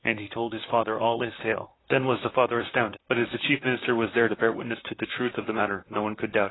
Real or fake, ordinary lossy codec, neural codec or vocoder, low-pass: fake; AAC, 16 kbps; vocoder, 44.1 kHz, 128 mel bands, Pupu-Vocoder; 7.2 kHz